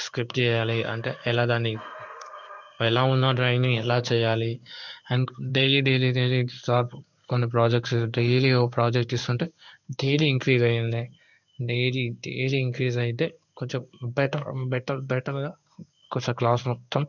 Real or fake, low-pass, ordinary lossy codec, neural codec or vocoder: fake; 7.2 kHz; none; codec, 16 kHz in and 24 kHz out, 1 kbps, XY-Tokenizer